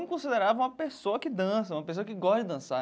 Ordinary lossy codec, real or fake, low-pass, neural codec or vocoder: none; real; none; none